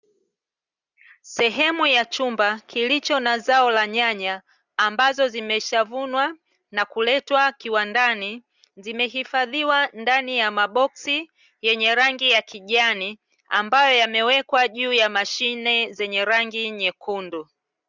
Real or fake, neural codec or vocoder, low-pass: real; none; 7.2 kHz